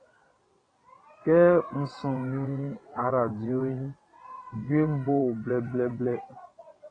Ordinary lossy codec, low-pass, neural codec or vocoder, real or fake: AAC, 32 kbps; 9.9 kHz; vocoder, 22.05 kHz, 80 mel bands, WaveNeXt; fake